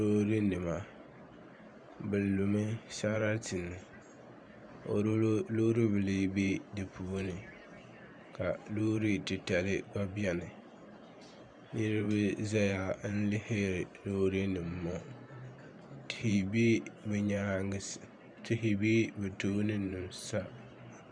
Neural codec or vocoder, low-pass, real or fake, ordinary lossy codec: vocoder, 44.1 kHz, 128 mel bands every 512 samples, BigVGAN v2; 9.9 kHz; fake; Opus, 64 kbps